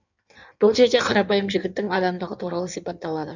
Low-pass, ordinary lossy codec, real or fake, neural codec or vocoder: 7.2 kHz; none; fake; codec, 16 kHz in and 24 kHz out, 1.1 kbps, FireRedTTS-2 codec